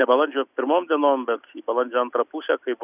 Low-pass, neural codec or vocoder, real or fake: 3.6 kHz; none; real